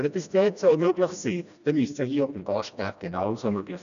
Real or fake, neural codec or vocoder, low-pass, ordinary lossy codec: fake; codec, 16 kHz, 1 kbps, FreqCodec, smaller model; 7.2 kHz; none